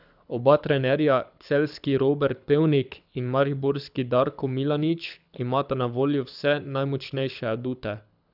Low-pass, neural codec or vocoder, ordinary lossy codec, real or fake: 5.4 kHz; codec, 24 kHz, 6 kbps, HILCodec; none; fake